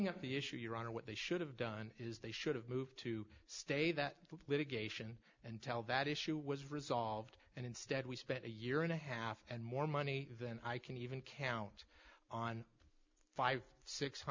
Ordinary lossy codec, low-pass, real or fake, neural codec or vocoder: MP3, 64 kbps; 7.2 kHz; real; none